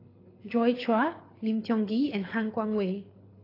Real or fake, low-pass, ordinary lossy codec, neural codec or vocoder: fake; 5.4 kHz; AAC, 24 kbps; codec, 24 kHz, 6 kbps, HILCodec